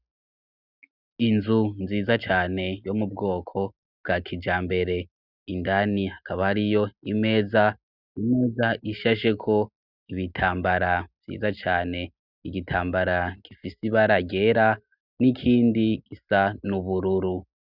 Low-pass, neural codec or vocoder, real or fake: 5.4 kHz; none; real